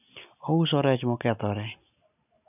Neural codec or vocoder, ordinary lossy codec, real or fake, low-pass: none; none; real; 3.6 kHz